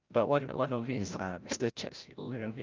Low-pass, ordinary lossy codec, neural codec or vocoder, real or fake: 7.2 kHz; Opus, 24 kbps; codec, 16 kHz, 0.5 kbps, FreqCodec, larger model; fake